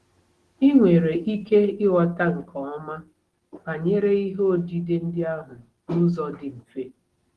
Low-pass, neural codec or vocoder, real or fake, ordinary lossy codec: 10.8 kHz; none; real; Opus, 16 kbps